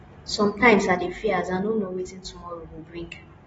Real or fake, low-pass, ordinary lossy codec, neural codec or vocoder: real; 9.9 kHz; AAC, 24 kbps; none